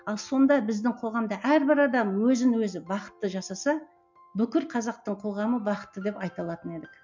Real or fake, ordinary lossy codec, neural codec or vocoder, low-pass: real; MP3, 64 kbps; none; 7.2 kHz